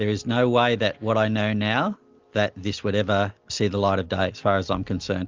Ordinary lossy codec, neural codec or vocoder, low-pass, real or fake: Opus, 32 kbps; none; 7.2 kHz; real